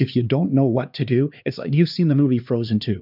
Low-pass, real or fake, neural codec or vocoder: 5.4 kHz; fake; codec, 16 kHz, 2 kbps, X-Codec, HuBERT features, trained on LibriSpeech